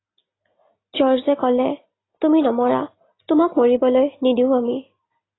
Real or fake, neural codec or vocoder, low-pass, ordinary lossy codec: real; none; 7.2 kHz; AAC, 16 kbps